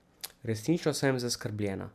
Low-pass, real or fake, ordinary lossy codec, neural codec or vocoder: 14.4 kHz; real; MP3, 96 kbps; none